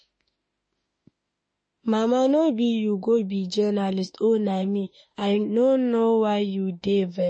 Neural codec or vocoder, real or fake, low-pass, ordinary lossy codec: autoencoder, 48 kHz, 32 numbers a frame, DAC-VAE, trained on Japanese speech; fake; 9.9 kHz; MP3, 32 kbps